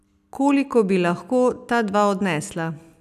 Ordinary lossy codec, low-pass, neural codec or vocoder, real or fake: none; 14.4 kHz; none; real